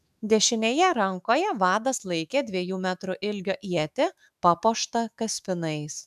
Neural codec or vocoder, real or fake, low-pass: autoencoder, 48 kHz, 128 numbers a frame, DAC-VAE, trained on Japanese speech; fake; 14.4 kHz